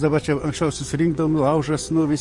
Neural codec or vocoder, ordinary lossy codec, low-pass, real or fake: vocoder, 24 kHz, 100 mel bands, Vocos; MP3, 48 kbps; 10.8 kHz; fake